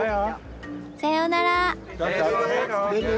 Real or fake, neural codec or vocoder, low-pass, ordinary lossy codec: real; none; none; none